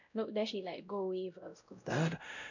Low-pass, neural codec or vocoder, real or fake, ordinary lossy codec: 7.2 kHz; codec, 16 kHz, 0.5 kbps, X-Codec, WavLM features, trained on Multilingual LibriSpeech; fake; none